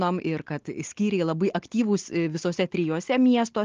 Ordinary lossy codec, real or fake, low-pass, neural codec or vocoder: Opus, 24 kbps; real; 7.2 kHz; none